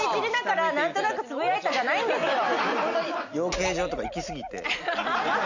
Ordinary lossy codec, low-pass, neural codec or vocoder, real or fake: none; 7.2 kHz; none; real